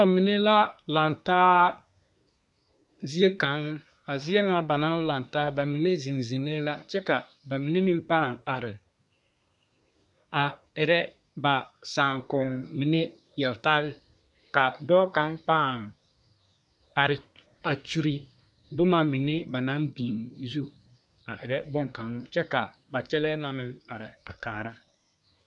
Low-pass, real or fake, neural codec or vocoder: 10.8 kHz; fake; codec, 24 kHz, 1 kbps, SNAC